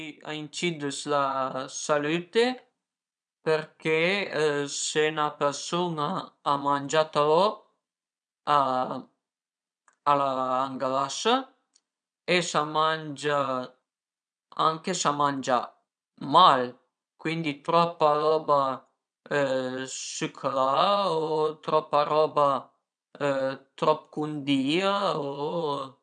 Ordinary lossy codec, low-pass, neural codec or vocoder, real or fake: none; 9.9 kHz; vocoder, 22.05 kHz, 80 mel bands, Vocos; fake